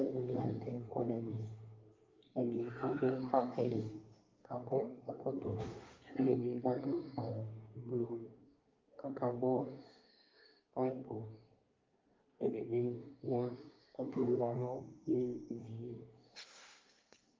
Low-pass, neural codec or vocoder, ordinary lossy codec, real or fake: 7.2 kHz; codec, 24 kHz, 1 kbps, SNAC; Opus, 24 kbps; fake